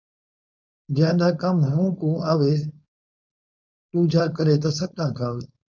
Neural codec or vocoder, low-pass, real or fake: codec, 16 kHz, 4.8 kbps, FACodec; 7.2 kHz; fake